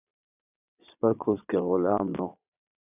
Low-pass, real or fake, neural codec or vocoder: 3.6 kHz; fake; vocoder, 22.05 kHz, 80 mel bands, Vocos